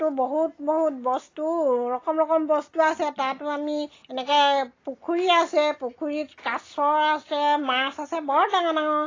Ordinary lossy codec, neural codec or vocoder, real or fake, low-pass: AAC, 32 kbps; none; real; 7.2 kHz